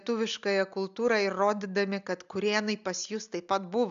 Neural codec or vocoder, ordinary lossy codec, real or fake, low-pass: none; AAC, 96 kbps; real; 7.2 kHz